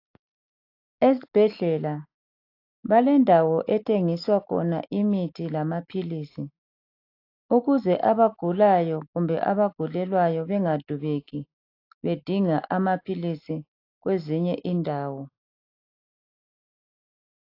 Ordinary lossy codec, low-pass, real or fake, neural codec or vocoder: AAC, 32 kbps; 5.4 kHz; real; none